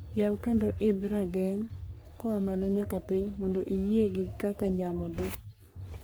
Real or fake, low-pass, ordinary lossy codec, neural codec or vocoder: fake; none; none; codec, 44.1 kHz, 3.4 kbps, Pupu-Codec